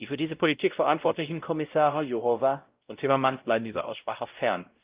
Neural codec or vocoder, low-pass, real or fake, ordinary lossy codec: codec, 16 kHz, 0.5 kbps, X-Codec, WavLM features, trained on Multilingual LibriSpeech; 3.6 kHz; fake; Opus, 32 kbps